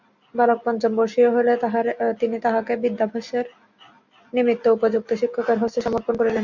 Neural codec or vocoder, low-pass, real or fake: none; 7.2 kHz; real